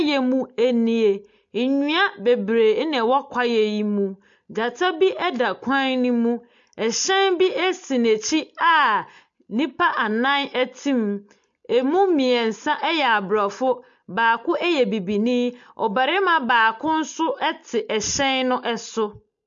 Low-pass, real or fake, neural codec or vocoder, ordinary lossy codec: 7.2 kHz; real; none; MP3, 48 kbps